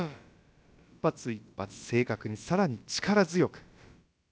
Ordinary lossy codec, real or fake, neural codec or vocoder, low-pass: none; fake; codec, 16 kHz, about 1 kbps, DyCAST, with the encoder's durations; none